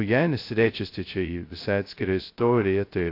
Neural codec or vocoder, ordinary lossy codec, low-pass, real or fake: codec, 16 kHz, 0.2 kbps, FocalCodec; AAC, 32 kbps; 5.4 kHz; fake